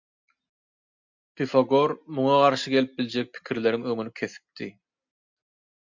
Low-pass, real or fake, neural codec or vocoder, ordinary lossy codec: 7.2 kHz; real; none; MP3, 64 kbps